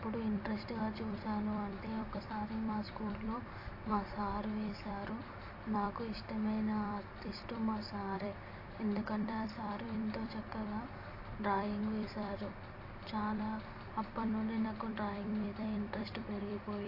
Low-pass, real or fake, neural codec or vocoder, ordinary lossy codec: 5.4 kHz; fake; vocoder, 44.1 kHz, 128 mel bands every 256 samples, BigVGAN v2; AAC, 32 kbps